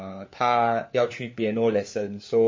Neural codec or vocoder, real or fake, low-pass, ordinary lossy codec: codec, 16 kHz, 4 kbps, FunCodec, trained on LibriTTS, 50 frames a second; fake; 7.2 kHz; MP3, 32 kbps